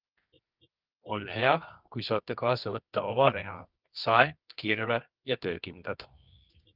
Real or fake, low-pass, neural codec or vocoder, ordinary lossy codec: fake; 5.4 kHz; codec, 24 kHz, 0.9 kbps, WavTokenizer, medium music audio release; Opus, 24 kbps